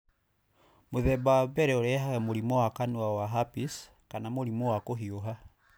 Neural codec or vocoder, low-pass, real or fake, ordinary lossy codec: none; none; real; none